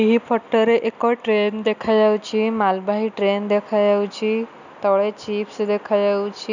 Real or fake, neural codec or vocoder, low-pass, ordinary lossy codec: real; none; 7.2 kHz; none